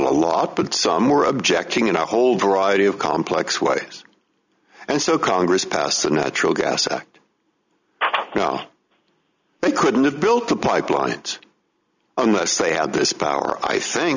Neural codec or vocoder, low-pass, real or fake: none; 7.2 kHz; real